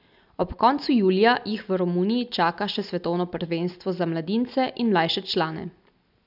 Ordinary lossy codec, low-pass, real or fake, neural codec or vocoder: none; 5.4 kHz; real; none